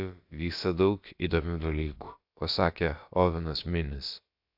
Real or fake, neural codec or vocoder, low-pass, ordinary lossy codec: fake; codec, 16 kHz, about 1 kbps, DyCAST, with the encoder's durations; 5.4 kHz; AAC, 48 kbps